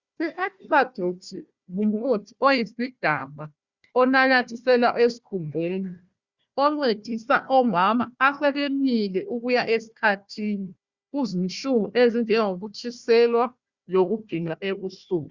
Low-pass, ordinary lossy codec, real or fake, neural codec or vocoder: 7.2 kHz; Opus, 64 kbps; fake; codec, 16 kHz, 1 kbps, FunCodec, trained on Chinese and English, 50 frames a second